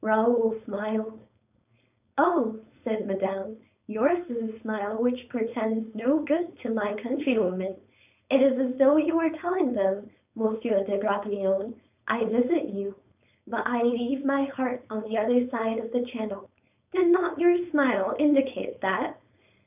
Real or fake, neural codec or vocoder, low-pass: fake; codec, 16 kHz, 4.8 kbps, FACodec; 3.6 kHz